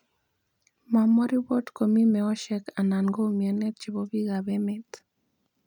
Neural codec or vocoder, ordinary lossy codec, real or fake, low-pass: none; none; real; 19.8 kHz